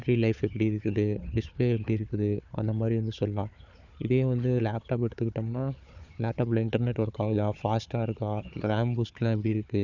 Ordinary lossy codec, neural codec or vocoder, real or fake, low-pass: none; codec, 16 kHz, 4 kbps, FunCodec, trained on Chinese and English, 50 frames a second; fake; 7.2 kHz